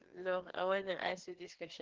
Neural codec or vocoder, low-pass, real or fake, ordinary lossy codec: codec, 16 kHz, 2 kbps, FreqCodec, larger model; 7.2 kHz; fake; Opus, 16 kbps